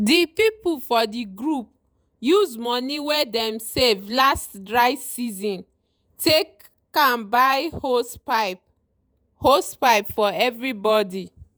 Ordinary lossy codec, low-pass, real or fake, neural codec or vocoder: none; none; fake; vocoder, 48 kHz, 128 mel bands, Vocos